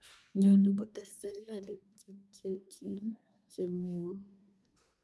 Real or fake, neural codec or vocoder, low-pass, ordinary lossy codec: fake; codec, 24 kHz, 1 kbps, SNAC; none; none